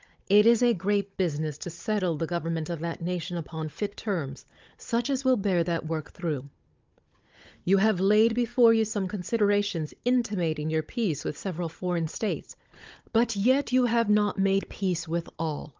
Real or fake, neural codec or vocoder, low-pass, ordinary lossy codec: fake; codec, 16 kHz, 16 kbps, FunCodec, trained on Chinese and English, 50 frames a second; 7.2 kHz; Opus, 32 kbps